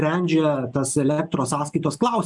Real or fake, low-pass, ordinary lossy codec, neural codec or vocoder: real; 10.8 kHz; MP3, 96 kbps; none